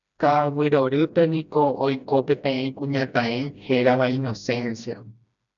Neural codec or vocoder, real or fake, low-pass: codec, 16 kHz, 1 kbps, FreqCodec, smaller model; fake; 7.2 kHz